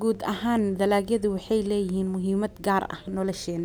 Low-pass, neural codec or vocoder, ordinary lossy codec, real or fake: none; none; none; real